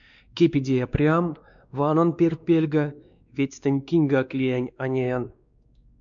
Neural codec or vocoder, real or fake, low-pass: codec, 16 kHz, 2 kbps, X-Codec, WavLM features, trained on Multilingual LibriSpeech; fake; 7.2 kHz